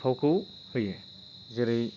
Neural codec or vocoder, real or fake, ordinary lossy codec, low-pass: none; real; none; 7.2 kHz